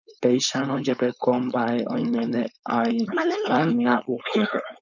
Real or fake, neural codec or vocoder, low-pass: fake; codec, 16 kHz, 4.8 kbps, FACodec; 7.2 kHz